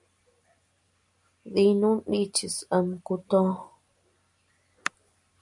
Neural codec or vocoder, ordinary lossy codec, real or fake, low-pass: none; MP3, 96 kbps; real; 10.8 kHz